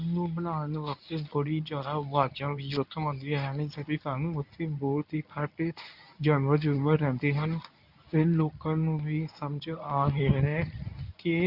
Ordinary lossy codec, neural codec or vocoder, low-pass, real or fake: none; codec, 24 kHz, 0.9 kbps, WavTokenizer, medium speech release version 1; 5.4 kHz; fake